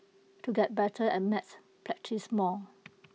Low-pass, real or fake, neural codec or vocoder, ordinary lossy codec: none; real; none; none